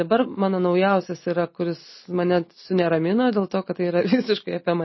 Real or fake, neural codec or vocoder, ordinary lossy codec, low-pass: real; none; MP3, 24 kbps; 7.2 kHz